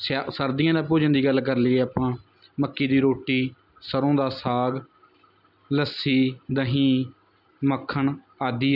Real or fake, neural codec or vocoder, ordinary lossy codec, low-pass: real; none; none; 5.4 kHz